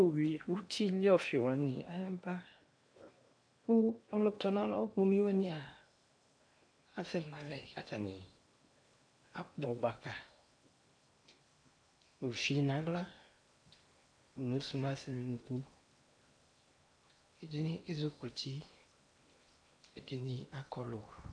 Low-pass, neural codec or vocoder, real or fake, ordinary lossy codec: 9.9 kHz; codec, 16 kHz in and 24 kHz out, 0.8 kbps, FocalCodec, streaming, 65536 codes; fake; AAC, 64 kbps